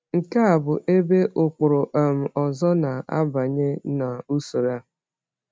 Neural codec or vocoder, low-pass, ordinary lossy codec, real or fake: none; none; none; real